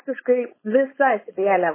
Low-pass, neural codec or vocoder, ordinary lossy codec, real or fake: 3.6 kHz; codec, 16 kHz, 4.8 kbps, FACodec; MP3, 16 kbps; fake